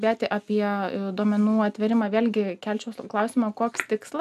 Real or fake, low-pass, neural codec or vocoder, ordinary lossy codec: real; 14.4 kHz; none; AAC, 96 kbps